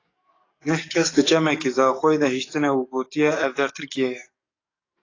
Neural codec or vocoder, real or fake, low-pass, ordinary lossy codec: codec, 44.1 kHz, 7.8 kbps, DAC; fake; 7.2 kHz; AAC, 32 kbps